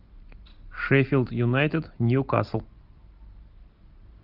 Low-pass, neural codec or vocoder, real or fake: 5.4 kHz; none; real